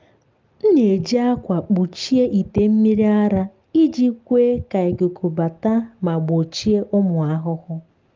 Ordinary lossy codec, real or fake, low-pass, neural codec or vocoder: Opus, 32 kbps; real; 7.2 kHz; none